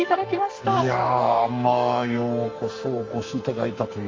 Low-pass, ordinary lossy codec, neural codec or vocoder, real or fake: 7.2 kHz; Opus, 32 kbps; codec, 44.1 kHz, 2.6 kbps, SNAC; fake